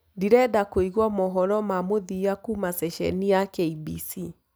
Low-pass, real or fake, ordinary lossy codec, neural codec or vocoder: none; real; none; none